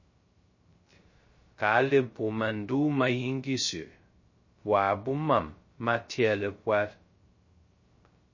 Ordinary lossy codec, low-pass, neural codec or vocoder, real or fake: MP3, 32 kbps; 7.2 kHz; codec, 16 kHz, 0.2 kbps, FocalCodec; fake